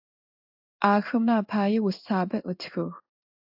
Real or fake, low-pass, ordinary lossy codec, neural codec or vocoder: fake; 5.4 kHz; AAC, 48 kbps; codec, 16 kHz in and 24 kHz out, 1 kbps, XY-Tokenizer